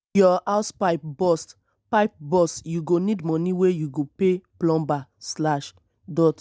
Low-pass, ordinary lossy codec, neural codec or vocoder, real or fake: none; none; none; real